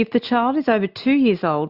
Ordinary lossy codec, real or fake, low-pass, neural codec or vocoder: Opus, 64 kbps; real; 5.4 kHz; none